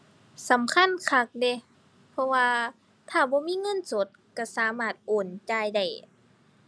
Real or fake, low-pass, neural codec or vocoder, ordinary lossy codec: real; none; none; none